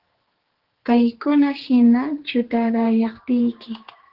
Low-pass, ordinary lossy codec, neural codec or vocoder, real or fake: 5.4 kHz; Opus, 16 kbps; codec, 16 kHz, 4 kbps, X-Codec, HuBERT features, trained on general audio; fake